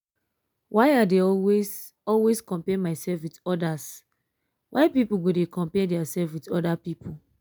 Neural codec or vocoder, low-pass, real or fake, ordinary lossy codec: none; none; real; none